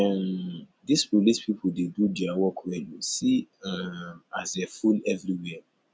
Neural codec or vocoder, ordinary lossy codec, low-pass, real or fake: none; none; none; real